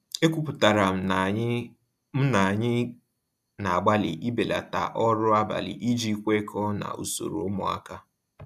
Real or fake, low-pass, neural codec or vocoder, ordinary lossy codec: real; 14.4 kHz; none; none